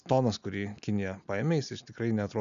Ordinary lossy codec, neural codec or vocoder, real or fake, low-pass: AAC, 96 kbps; none; real; 7.2 kHz